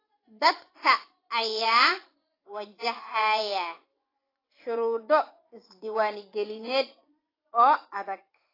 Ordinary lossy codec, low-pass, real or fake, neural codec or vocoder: AAC, 24 kbps; 5.4 kHz; fake; vocoder, 24 kHz, 100 mel bands, Vocos